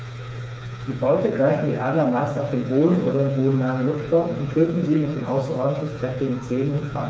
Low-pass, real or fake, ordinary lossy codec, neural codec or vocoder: none; fake; none; codec, 16 kHz, 4 kbps, FreqCodec, smaller model